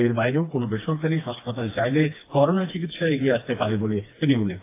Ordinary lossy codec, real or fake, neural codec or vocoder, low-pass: AAC, 24 kbps; fake; codec, 16 kHz, 2 kbps, FreqCodec, smaller model; 3.6 kHz